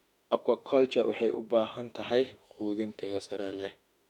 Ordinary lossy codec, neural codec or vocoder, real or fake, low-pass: none; autoencoder, 48 kHz, 32 numbers a frame, DAC-VAE, trained on Japanese speech; fake; 19.8 kHz